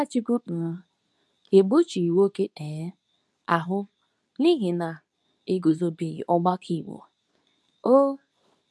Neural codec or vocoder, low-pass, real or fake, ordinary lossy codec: codec, 24 kHz, 0.9 kbps, WavTokenizer, medium speech release version 2; none; fake; none